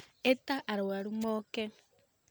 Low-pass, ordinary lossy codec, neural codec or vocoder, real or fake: none; none; none; real